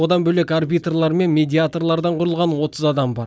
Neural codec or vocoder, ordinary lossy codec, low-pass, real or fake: none; none; none; real